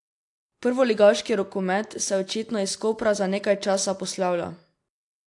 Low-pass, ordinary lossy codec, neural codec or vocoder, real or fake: 10.8 kHz; AAC, 64 kbps; none; real